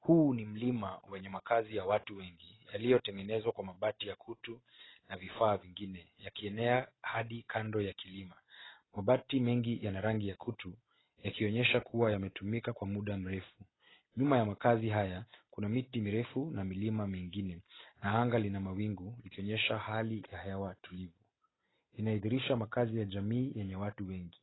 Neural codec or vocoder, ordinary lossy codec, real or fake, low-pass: none; AAC, 16 kbps; real; 7.2 kHz